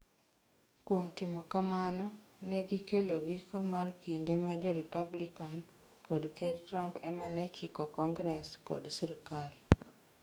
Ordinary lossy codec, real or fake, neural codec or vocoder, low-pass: none; fake; codec, 44.1 kHz, 2.6 kbps, DAC; none